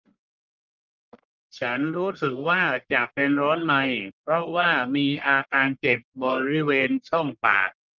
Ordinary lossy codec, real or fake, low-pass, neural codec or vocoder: Opus, 32 kbps; fake; 7.2 kHz; codec, 44.1 kHz, 1.7 kbps, Pupu-Codec